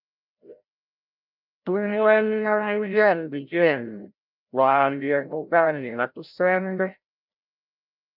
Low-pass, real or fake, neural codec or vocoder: 5.4 kHz; fake; codec, 16 kHz, 0.5 kbps, FreqCodec, larger model